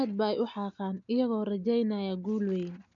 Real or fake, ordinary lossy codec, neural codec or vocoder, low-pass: real; none; none; 7.2 kHz